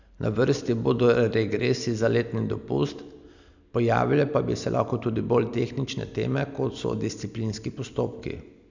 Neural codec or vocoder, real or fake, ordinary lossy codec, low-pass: none; real; none; 7.2 kHz